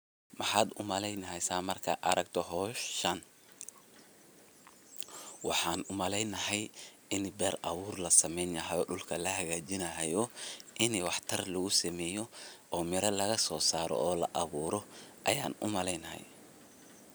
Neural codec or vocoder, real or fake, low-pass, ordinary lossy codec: none; real; none; none